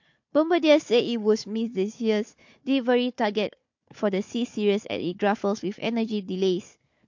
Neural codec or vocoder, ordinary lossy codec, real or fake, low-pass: codec, 16 kHz, 4 kbps, FunCodec, trained on Chinese and English, 50 frames a second; MP3, 48 kbps; fake; 7.2 kHz